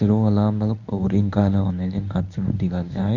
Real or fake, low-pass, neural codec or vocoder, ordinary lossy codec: fake; 7.2 kHz; codec, 24 kHz, 0.9 kbps, WavTokenizer, medium speech release version 1; none